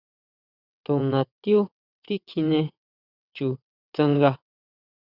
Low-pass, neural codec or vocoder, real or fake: 5.4 kHz; vocoder, 22.05 kHz, 80 mel bands, WaveNeXt; fake